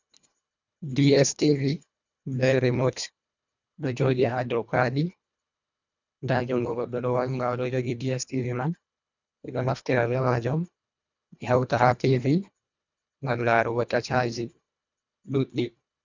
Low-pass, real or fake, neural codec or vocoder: 7.2 kHz; fake; codec, 24 kHz, 1.5 kbps, HILCodec